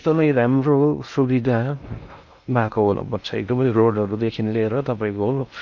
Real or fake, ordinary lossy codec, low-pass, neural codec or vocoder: fake; none; 7.2 kHz; codec, 16 kHz in and 24 kHz out, 0.6 kbps, FocalCodec, streaming, 4096 codes